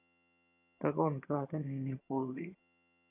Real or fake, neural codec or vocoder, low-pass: fake; vocoder, 22.05 kHz, 80 mel bands, HiFi-GAN; 3.6 kHz